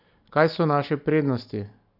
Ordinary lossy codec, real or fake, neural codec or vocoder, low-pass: none; real; none; 5.4 kHz